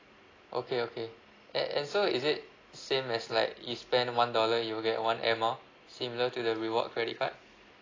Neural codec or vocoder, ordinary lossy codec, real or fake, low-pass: none; AAC, 32 kbps; real; 7.2 kHz